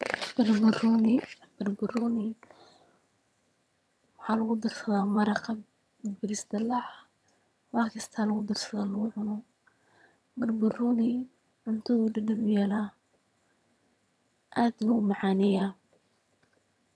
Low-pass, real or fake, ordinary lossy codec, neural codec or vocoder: none; fake; none; vocoder, 22.05 kHz, 80 mel bands, HiFi-GAN